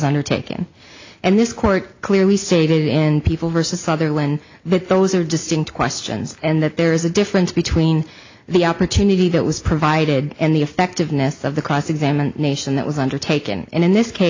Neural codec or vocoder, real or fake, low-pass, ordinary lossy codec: none; real; 7.2 kHz; AAC, 48 kbps